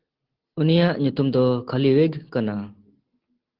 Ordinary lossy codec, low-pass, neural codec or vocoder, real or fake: Opus, 16 kbps; 5.4 kHz; none; real